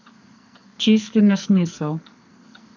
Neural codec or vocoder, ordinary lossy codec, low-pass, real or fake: codec, 44.1 kHz, 2.6 kbps, SNAC; none; 7.2 kHz; fake